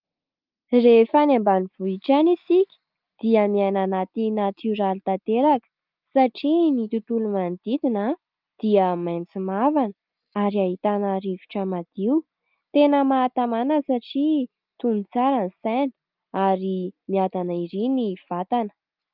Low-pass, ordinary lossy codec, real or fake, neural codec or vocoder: 5.4 kHz; Opus, 24 kbps; real; none